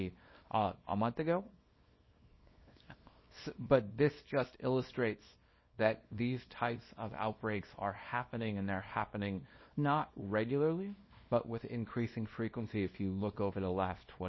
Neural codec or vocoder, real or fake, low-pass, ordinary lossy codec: codec, 24 kHz, 0.9 kbps, WavTokenizer, small release; fake; 7.2 kHz; MP3, 24 kbps